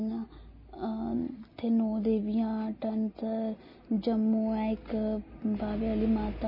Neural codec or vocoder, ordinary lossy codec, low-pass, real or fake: none; MP3, 24 kbps; 5.4 kHz; real